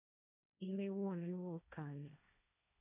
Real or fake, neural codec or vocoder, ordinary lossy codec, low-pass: fake; codec, 16 kHz, 1.1 kbps, Voila-Tokenizer; none; 3.6 kHz